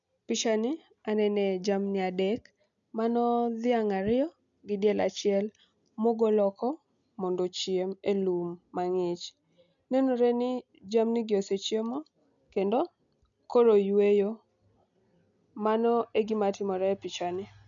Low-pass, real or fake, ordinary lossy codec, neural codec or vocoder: 7.2 kHz; real; none; none